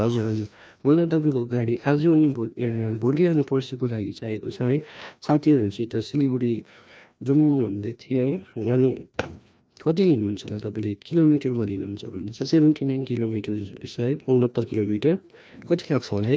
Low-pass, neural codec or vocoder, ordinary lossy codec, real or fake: none; codec, 16 kHz, 1 kbps, FreqCodec, larger model; none; fake